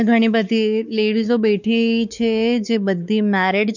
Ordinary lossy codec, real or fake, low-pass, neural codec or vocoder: none; fake; 7.2 kHz; codec, 16 kHz, 4 kbps, X-Codec, WavLM features, trained on Multilingual LibriSpeech